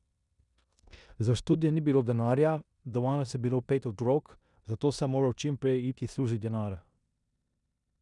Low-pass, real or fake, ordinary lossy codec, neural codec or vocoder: 10.8 kHz; fake; none; codec, 16 kHz in and 24 kHz out, 0.9 kbps, LongCat-Audio-Codec, four codebook decoder